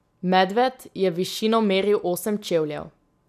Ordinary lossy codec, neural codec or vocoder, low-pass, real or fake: none; none; 14.4 kHz; real